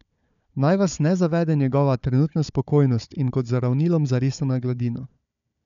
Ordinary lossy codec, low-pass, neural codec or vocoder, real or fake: none; 7.2 kHz; codec, 16 kHz, 4 kbps, FunCodec, trained on Chinese and English, 50 frames a second; fake